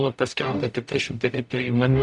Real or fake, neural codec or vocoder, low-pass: fake; codec, 44.1 kHz, 0.9 kbps, DAC; 10.8 kHz